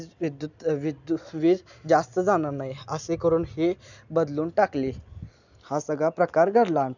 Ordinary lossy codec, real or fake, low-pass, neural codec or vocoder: none; real; 7.2 kHz; none